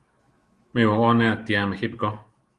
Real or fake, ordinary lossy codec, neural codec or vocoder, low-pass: fake; Opus, 32 kbps; autoencoder, 48 kHz, 128 numbers a frame, DAC-VAE, trained on Japanese speech; 10.8 kHz